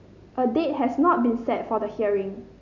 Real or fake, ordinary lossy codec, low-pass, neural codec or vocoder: real; none; 7.2 kHz; none